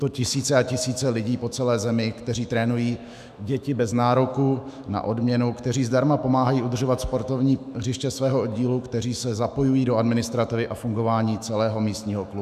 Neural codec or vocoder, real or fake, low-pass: autoencoder, 48 kHz, 128 numbers a frame, DAC-VAE, trained on Japanese speech; fake; 14.4 kHz